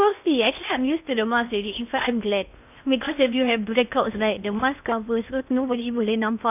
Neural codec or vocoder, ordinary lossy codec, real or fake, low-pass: codec, 16 kHz in and 24 kHz out, 0.6 kbps, FocalCodec, streaming, 4096 codes; none; fake; 3.6 kHz